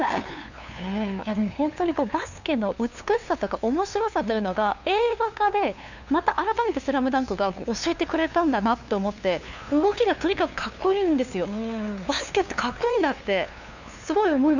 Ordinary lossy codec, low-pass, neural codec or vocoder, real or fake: none; 7.2 kHz; codec, 16 kHz, 2 kbps, FunCodec, trained on LibriTTS, 25 frames a second; fake